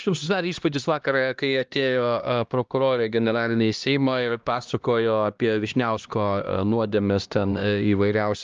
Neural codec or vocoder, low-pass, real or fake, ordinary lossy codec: codec, 16 kHz, 1 kbps, X-Codec, HuBERT features, trained on LibriSpeech; 7.2 kHz; fake; Opus, 24 kbps